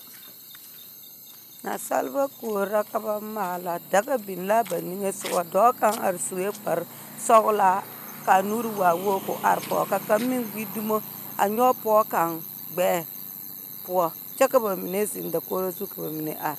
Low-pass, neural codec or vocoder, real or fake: 14.4 kHz; none; real